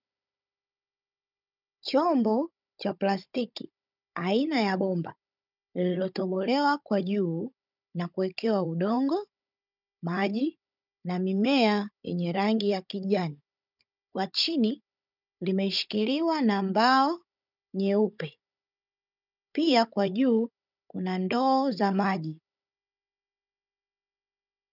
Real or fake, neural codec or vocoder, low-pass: fake; codec, 16 kHz, 16 kbps, FunCodec, trained on Chinese and English, 50 frames a second; 5.4 kHz